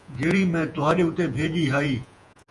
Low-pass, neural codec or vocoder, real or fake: 10.8 kHz; vocoder, 48 kHz, 128 mel bands, Vocos; fake